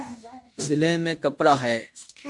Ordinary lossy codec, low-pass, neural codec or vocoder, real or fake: MP3, 64 kbps; 10.8 kHz; codec, 16 kHz in and 24 kHz out, 0.9 kbps, LongCat-Audio-Codec, fine tuned four codebook decoder; fake